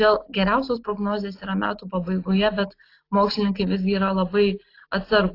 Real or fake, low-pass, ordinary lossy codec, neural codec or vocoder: real; 5.4 kHz; AAC, 32 kbps; none